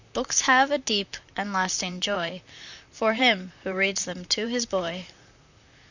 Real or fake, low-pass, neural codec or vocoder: fake; 7.2 kHz; vocoder, 44.1 kHz, 128 mel bands, Pupu-Vocoder